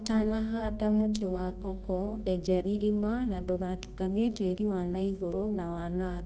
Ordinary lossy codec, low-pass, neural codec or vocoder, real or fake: none; none; codec, 24 kHz, 0.9 kbps, WavTokenizer, medium music audio release; fake